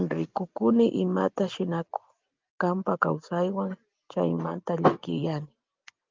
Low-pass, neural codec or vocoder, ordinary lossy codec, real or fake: 7.2 kHz; none; Opus, 32 kbps; real